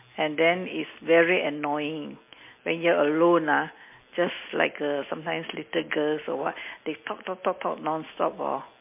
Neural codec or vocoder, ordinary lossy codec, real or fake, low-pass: none; MP3, 24 kbps; real; 3.6 kHz